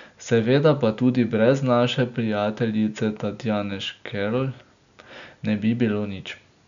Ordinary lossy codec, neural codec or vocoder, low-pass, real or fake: none; none; 7.2 kHz; real